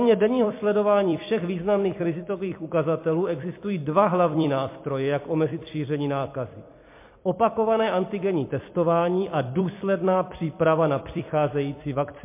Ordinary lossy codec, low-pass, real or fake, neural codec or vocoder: MP3, 24 kbps; 3.6 kHz; fake; vocoder, 44.1 kHz, 128 mel bands every 256 samples, BigVGAN v2